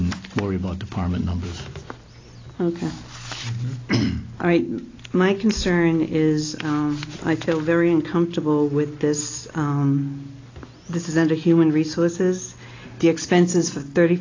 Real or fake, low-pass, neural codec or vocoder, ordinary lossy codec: real; 7.2 kHz; none; AAC, 32 kbps